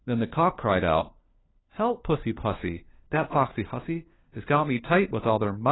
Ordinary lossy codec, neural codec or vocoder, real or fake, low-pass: AAC, 16 kbps; codec, 16 kHz, 4 kbps, FunCodec, trained on LibriTTS, 50 frames a second; fake; 7.2 kHz